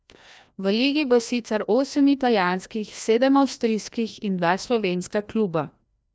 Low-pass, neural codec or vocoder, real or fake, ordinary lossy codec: none; codec, 16 kHz, 1 kbps, FreqCodec, larger model; fake; none